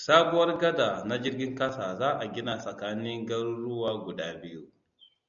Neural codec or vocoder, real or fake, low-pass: none; real; 7.2 kHz